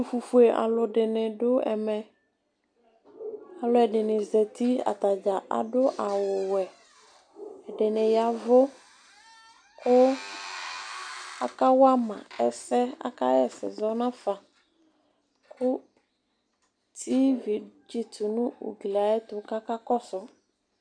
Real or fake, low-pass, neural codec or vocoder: real; 9.9 kHz; none